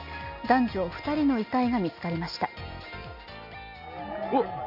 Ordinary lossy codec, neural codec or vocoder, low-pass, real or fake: none; none; 5.4 kHz; real